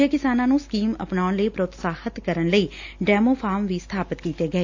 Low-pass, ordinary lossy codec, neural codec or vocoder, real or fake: 7.2 kHz; AAC, 48 kbps; none; real